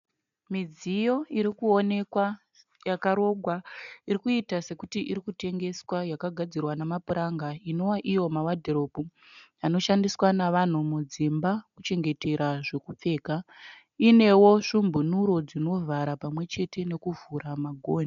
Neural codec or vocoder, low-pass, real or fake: none; 7.2 kHz; real